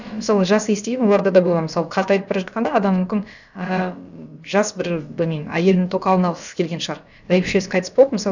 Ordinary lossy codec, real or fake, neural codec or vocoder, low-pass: none; fake; codec, 16 kHz, about 1 kbps, DyCAST, with the encoder's durations; 7.2 kHz